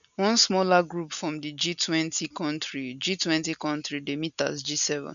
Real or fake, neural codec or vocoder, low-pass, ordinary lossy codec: real; none; 7.2 kHz; none